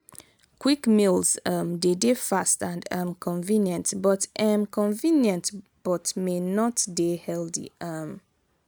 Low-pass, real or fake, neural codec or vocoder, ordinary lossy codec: none; real; none; none